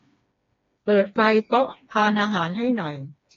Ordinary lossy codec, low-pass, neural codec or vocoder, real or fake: AAC, 32 kbps; 7.2 kHz; codec, 16 kHz, 2 kbps, FreqCodec, smaller model; fake